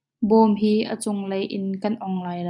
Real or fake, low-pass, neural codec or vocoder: real; 10.8 kHz; none